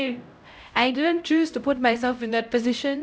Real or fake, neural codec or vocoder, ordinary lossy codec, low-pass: fake; codec, 16 kHz, 0.5 kbps, X-Codec, HuBERT features, trained on LibriSpeech; none; none